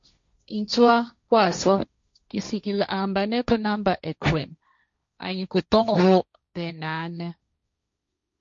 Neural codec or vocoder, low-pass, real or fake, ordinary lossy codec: codec, 16 kHz, 1.1 kbps, Voila-Tokenizer; 7.2 kHz; fake; MP3, 48 kbps